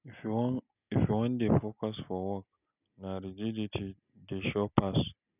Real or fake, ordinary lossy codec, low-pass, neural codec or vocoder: real; none; 3.6 kHz; none